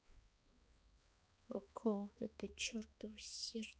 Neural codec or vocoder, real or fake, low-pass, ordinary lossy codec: codec, 16 kHz, 2 kbps, X-Codec, HuBERT features, trained on balanced general audio; fake; none; none